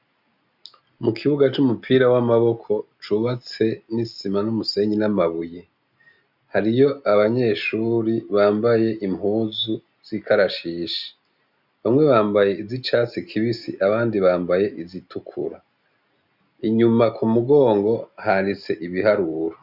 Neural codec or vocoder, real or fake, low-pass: none; real; 5.4 kHz